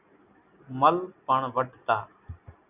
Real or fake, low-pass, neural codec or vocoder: real; 3.6 kHz; none